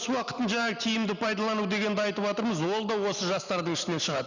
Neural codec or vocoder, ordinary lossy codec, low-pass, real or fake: none; none; 7.2 kHz; real